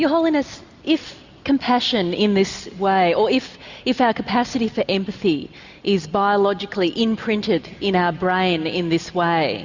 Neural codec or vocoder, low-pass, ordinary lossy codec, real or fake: none; 7.2 kHz; Opus, 64 kbps; real